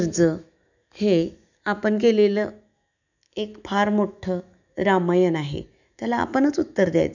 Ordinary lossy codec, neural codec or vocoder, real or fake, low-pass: none; autoencoder, 48 kHz, 128 numbers a frame, DAC-VAE, trained on Japanese speech; fake; 7.2 kHz